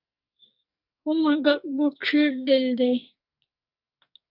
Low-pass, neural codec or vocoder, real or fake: 5.4 kHz; codec, 44.1 kHz, 2.6 kbps, SNAC; fake